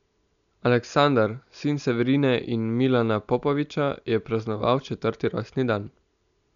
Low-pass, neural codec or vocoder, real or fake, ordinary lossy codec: 7.2 kHz; none; real; none